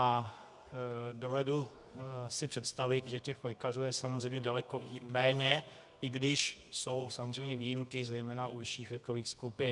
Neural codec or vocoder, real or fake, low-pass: codec, 24 kHz, 0.9 kbps, WavTokenizer, medium music audio release; fake; 10.8 kHz